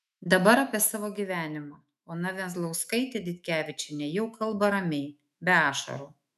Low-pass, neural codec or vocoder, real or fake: 14.4 kHz; autoencoder, 48 kHz, 128 numbers a frame, DAC-VAE, trained on Japanese speech; fake